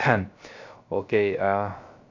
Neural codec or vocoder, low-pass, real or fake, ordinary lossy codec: codec, 16 kHz, 0.3 kbps, FocalCodec; 7.2 kHz; fake; AAC, 48 kbps